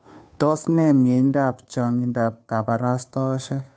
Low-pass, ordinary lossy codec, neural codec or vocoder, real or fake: none; none; codec, 16 kHz, 2 kbps, FunCodec, trained on Chinese and English, 25 frames a second; fake